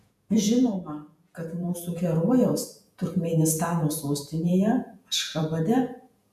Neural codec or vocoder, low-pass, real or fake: vocoder, 48 kHz, 128 mel bands, Vocos; 14.4 kHz; fake